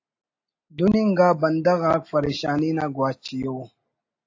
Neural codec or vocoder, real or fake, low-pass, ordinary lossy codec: none; real; 7.2 kHz; AAC, 48 kbps